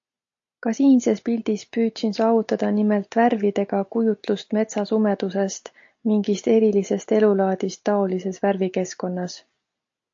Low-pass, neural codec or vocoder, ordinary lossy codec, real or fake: 7.2 kHz; none; AAC, 48 kbps; real